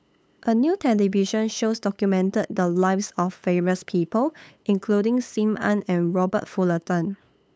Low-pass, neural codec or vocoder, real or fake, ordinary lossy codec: none; codec, 16 kHz, 8 kbps, FunCodec, trained on LibriTTS, 25 frames a second; fake; none